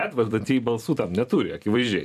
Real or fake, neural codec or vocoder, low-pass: fake; vocoder, 44.1 kHz, 128 mel bands every 256 samples, BigVGAN v2; 14.4 kHz